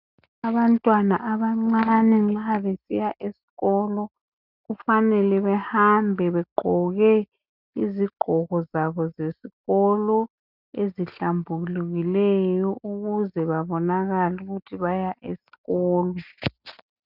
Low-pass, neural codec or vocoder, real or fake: 5.4 kHz; none; real